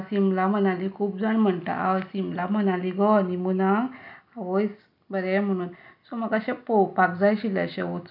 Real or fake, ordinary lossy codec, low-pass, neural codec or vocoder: real; none; 5.4 kHz; none